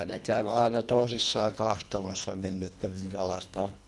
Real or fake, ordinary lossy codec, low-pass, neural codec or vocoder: fake; none; none; codec, 24 kHz, 1.5 kbps, HILCodec